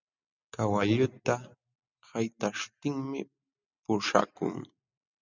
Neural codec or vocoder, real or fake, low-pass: none; real; 7.2 kHz